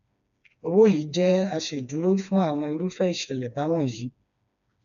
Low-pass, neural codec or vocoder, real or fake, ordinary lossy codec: 7.2 kHz; codec, 16 kHz, 2 kbps, FreqCodec, smaller model; fake; none